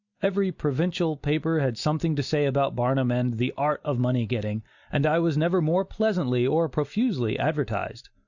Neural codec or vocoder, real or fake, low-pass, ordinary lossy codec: none; real; 7.2 kHz; Opus, 64 kbps